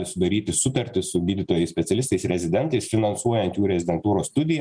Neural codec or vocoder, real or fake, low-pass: none; real; 9.9 kHz